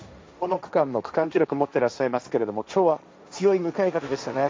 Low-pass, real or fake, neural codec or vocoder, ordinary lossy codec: none; fake; codec, 16 kHz, 1.1 kbps, Voila-Tokenizer; none